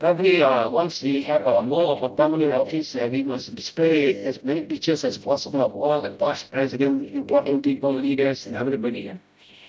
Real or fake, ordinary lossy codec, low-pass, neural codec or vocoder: fake; none; none; codec, 16 kHz, 0.5 kbps, FreqCodec, smaller model